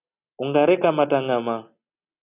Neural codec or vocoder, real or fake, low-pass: none; real; 3.6 kHz